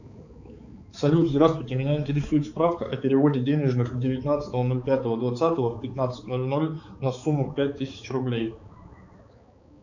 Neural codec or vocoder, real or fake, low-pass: codec, 16 kHz, 4 kbps, X-Codec, HuBERT features, trained on balanced general audio; fake; 7.2 kHz